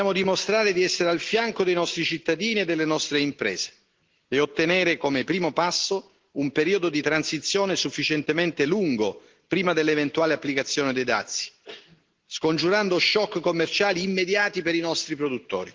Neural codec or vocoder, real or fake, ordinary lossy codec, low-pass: none; real; Opus, 16 kbps; 7.2 kHz